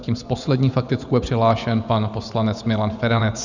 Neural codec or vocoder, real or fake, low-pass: vocoder, 24 kHz, 100 mel bands, Vocos; fake; 7.2 kHz